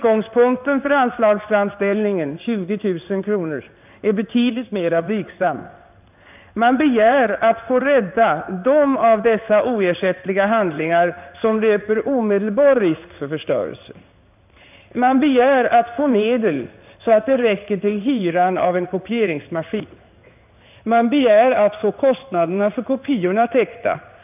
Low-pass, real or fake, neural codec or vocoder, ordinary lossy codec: 3.6 kHz; fake; codec, 16 kHz in and 24 kHz out, 1 kbps, XY-Tokenizer; none